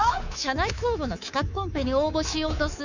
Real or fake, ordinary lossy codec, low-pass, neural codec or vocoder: fake; none; 7.2 kHz; codec, 24 kHz, 3.1 kbps, DualCodec